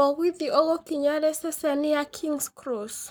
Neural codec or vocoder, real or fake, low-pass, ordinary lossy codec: codec, 44.1 kHz, 7.8 kbps, Pupu-Codec; fake; none; none